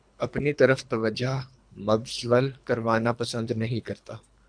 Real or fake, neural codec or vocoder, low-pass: fake; codec, 24 kHz, 3 kbps, HILCodec; 9.9 kHz